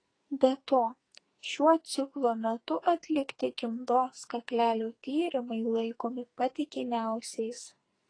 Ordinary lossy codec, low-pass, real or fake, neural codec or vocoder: AAC, 32 kbps; 9.9 kHz; fake; codec, 44.1 kHz, 2.6 kbps, SNAC